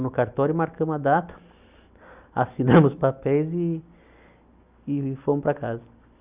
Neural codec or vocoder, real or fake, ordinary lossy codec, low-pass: none; real; Opus, 64 kbps; 3.6 kHz